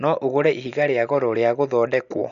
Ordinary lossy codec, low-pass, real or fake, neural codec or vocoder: none; 7.2 kHz; real; none